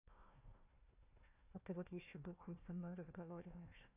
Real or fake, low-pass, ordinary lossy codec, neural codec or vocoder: fake; 3.6 kHz; none; codec, 16 kHz, 1 kbps, FunCodec, trained on Chinese and English, 50 frames a second